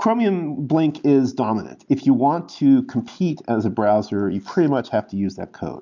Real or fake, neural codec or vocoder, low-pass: real; none; 7.2 kHz